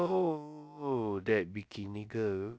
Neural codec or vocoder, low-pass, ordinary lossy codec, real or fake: codec, 16 kHz, about 1 kbps, DyCAST, with the encoder's durations; none; none; fake